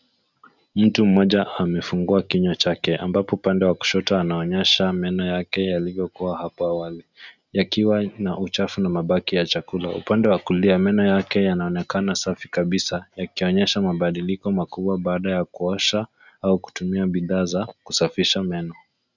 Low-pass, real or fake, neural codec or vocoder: 7.2 kHz; real; none